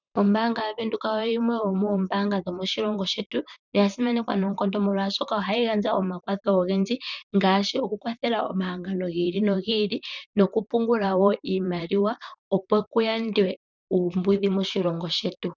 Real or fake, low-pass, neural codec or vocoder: fake; 7.2 kHz; vocoder, 44.1 kHz, 128 mel bands, Pupu-Vocoder